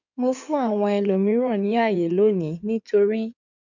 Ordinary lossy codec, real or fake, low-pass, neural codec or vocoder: none; fake; 7.2 kHz; codec, 16 kHz in and 24 kHz out, 2.2 kbps, FireRedTTS-2 codec